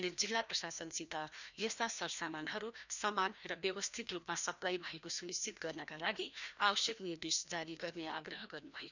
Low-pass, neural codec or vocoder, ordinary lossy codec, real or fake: 7.2 kHz; codec, 16 kHz, 1 kbps, FreqCodec, larger model; none; fake